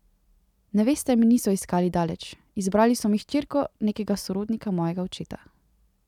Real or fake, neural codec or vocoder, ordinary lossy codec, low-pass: real; none; none; 19.8 kHz